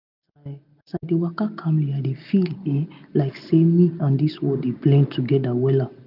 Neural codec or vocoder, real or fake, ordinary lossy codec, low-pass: none; real; none; 5.4 kHz